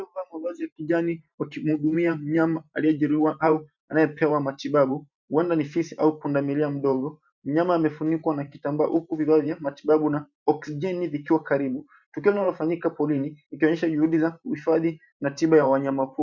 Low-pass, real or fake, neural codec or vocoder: 7.2 kHz; fake; vocoder, 44.1 kHz, 128 mel bands every 512 samples, BigVGAN v2